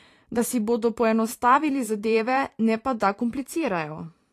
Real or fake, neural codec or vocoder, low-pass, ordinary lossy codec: fake; vocoder, 44.1 kHz, 128 mel bands every 512 samples, BigVGAN v2; 14.4 kHz; AAC, 48 kbps